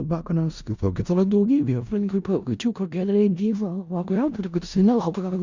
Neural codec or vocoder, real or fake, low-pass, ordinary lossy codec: codec, 16 kHz in and 24 kHz out, 0.4 kbps, LongCat-Audio-Codec, four codebook decoder; fake; 7.2 kHz; Opus, 64 kbps